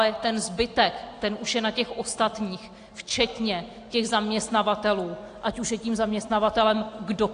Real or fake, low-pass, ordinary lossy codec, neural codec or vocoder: real; 9.9 kHz; AAC, 48 kbps; none